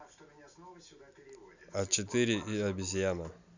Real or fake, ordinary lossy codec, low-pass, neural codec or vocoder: real; none; 7.2 kHz; none